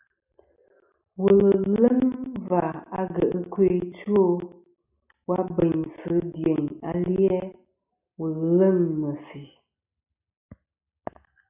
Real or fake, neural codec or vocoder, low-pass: real; none; 3.6 kHz